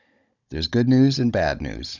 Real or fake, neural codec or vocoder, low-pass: fake; codec, 16 kHz, 16 kbps, FunCodec, trained on Chinese and English, 50 frames a second; 7.2 kHz